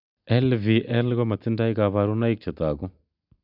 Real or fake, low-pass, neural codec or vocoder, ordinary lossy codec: real; 5.4 kHz; none; none